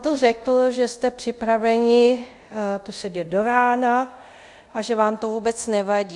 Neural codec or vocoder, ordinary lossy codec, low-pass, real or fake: codec, 24 kHz, 0.5 kbps, DualCodec; MP3, 64 kbps; 10.8 kHz; fake